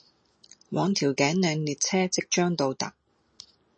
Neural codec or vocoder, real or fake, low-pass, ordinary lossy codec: none; real; 9.9 kHz; MP3, 32 kbps